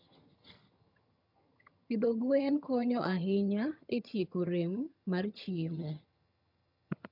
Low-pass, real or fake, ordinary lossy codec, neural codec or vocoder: 5.4 kHz; fake; MP3, 48 kbps; vocoder, 22.05 kHz, 80 mel bands, HiFi-GAN